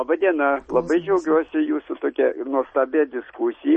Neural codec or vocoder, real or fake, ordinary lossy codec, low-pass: none; real; MP3, 32 kbps; 9.9 kHz